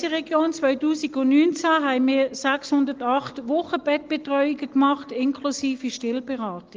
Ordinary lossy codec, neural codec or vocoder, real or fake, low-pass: Opus, 24 kbps; none; real; 7.2 kHz